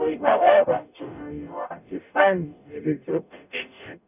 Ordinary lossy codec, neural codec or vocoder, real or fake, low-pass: none; codec, 44.1 kHz, 0.9 kbps, DAC; fake; 3.6 kHz